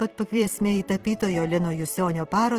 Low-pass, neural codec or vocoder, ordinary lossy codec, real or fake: 14.4 kHz; vocoder, 44.1 kHz, 128 mel bands every 512 samples, BigVGAN v2; Opus, 16 kbps; fake